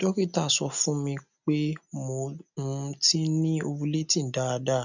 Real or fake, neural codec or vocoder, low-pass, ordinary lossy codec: real; none; 7.2 kHz; none